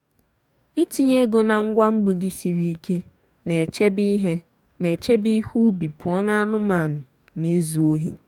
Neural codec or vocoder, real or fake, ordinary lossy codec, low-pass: codec, 44.1 kHz, 2.6 kbps, DAC; fake; none; 19.8 kHz